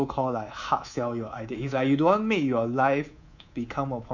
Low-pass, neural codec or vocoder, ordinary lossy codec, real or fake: 7.2 kHz; codec, 24 kHz, 3.1 kbps, DualCodec; none; fake